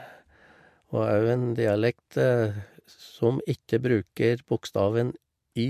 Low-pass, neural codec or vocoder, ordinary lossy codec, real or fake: 14.4 kHz; none; MP3, 64 kbps; real